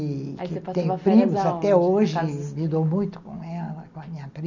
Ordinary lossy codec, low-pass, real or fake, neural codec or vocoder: none; 7.2 kHz; real; none